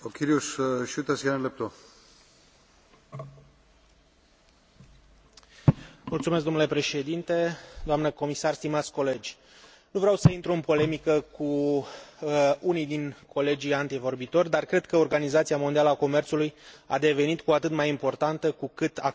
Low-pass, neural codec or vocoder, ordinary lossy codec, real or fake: none; none; none; real